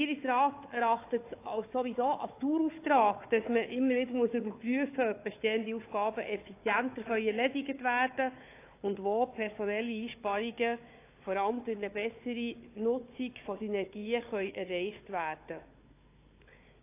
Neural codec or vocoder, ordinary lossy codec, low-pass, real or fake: codec, 16 kHz, 4 kbps, FunCodec, trained on Chinese and English, 50 frames a second; AAC, 24 kbps; 3.6 kHz; fake